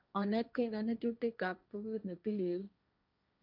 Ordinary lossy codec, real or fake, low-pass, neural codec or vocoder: none; fake; 5.4 kHz; codec, 16 kHz, 1.1 kbps, Voila-Tokenizer